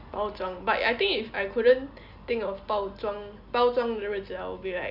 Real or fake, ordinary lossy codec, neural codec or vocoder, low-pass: real; none; none; 5.4 kHz